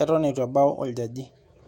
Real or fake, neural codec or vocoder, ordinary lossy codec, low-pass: fake; autoencoder, 48 kHz, 128 numbers a frame, DAC-VAE, trained on Japanese speech; MP3, 64 kbps; 19.8 kHz